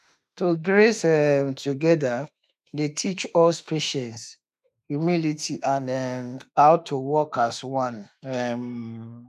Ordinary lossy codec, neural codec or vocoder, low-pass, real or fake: none; autoencoder, 48 kHz, 32 numbers a frame, DAC-VAE, trained on Japanese speech; 14.4 kHz; fake